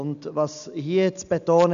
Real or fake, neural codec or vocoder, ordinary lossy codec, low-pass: real; none; none; 7.2 kHz